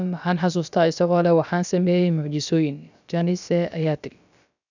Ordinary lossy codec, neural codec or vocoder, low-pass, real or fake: none; codec, 16 kHz, about 1 kbps, DyCAST, with the encoder's durations; 7.2 kHz; fake